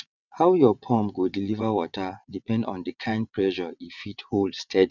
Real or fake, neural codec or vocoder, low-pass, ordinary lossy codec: fake; vocoder, 22.05 kHz, 80 mel bands, WaveNeXt; 7.2 kHz; none